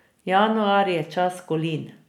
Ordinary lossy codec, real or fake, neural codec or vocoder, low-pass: none; real; none; 19.8 kHz